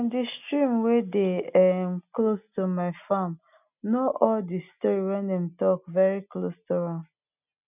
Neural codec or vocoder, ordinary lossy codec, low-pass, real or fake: none; none; 3.6 kHz; real